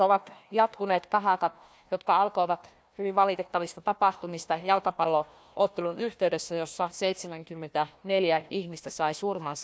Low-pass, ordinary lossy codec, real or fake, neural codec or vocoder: none; none; fake; codec, 16 kHz, 1 kbps, FunCodec, trained on Chinese and English, 50 frames a second